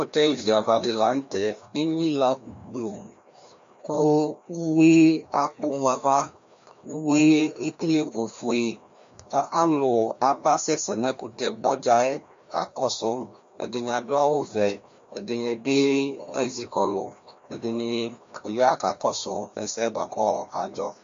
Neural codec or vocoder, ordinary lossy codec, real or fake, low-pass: codec, 16 kHz, 1 kbps, FreqCodec, larger model; MP3, 48 kbps; fake; 7.2 kHz